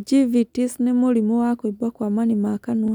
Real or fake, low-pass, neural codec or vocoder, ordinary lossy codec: real; 19.8 kHz; none; none